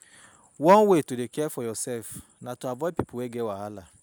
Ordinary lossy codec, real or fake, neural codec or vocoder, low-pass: none; real; none; none